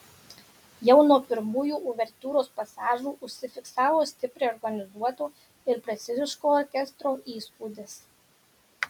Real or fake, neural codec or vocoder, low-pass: real; none; 19.8 kHz